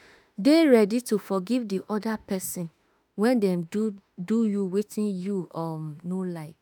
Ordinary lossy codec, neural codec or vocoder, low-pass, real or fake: none; autoencoder, 48 kHz, 32 numbers a frame, DAC-VAE, trained on Japanese speech; none; fake